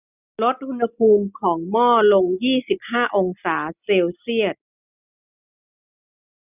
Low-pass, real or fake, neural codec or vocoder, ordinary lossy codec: 3.6 kHz; real; none; none